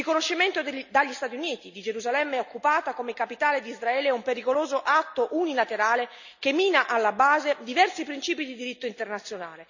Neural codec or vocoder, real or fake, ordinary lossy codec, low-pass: none; real; none; 7.2 kHz